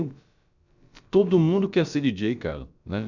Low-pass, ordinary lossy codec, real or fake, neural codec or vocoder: 7.2 kHz; none; fake; codec, 16 kHz, about 1 kbps, DyCAST, with the encoder's durations